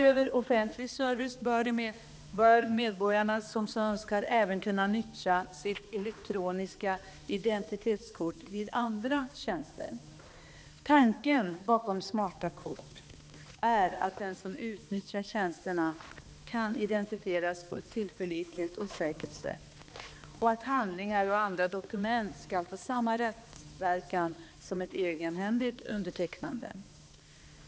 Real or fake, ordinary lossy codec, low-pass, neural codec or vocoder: fake; none; none; codec, 16 kHz, 2 kbps, X-Codec, HuBERT features, trained on balanced general audio